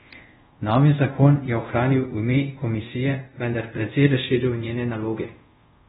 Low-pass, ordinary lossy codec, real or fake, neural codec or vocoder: 10.8 kHz; AAC, 16 kbps; fake; codec, 24 kHz, 0.5 kbps, DualCodec